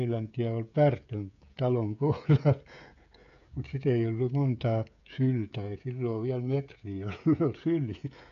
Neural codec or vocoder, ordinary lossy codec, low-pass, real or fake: codec, 16 kHz, 16 kbps, FreqCodec, smaller model; none; 7.2 kHz; fake